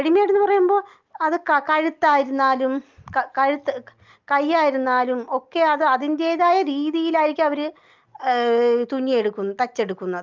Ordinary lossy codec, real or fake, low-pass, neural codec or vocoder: Opus, 24 kbps; real; 7.2 kHz; none